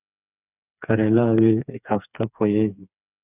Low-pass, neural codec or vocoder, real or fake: 3.6 kHz; codec, 16 kHz, 4 kbps, FreqCodec, smaller model; fake